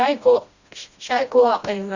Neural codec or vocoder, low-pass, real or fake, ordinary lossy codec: codec, 16 kHz, 1 kbps, FreqCodec, smaller model; 7.2 kHz; fake; Opus, 64 kbps